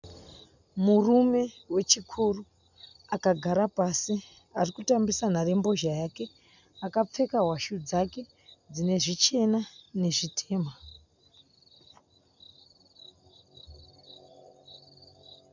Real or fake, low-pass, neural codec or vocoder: real; 7.2 kHz; none